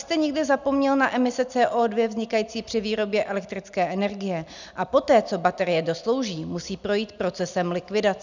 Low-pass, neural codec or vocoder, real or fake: 7.2 kHz; none; real